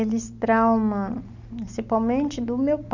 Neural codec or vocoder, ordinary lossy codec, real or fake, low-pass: none; none; real; 7.2 kHz